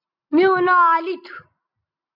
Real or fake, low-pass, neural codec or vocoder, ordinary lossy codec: real; 5.4 kHz; none; MP3, 48 kbps